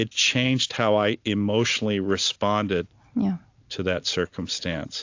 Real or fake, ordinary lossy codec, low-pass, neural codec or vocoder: real; AAC, 48 kbps; 7.2 kHz; none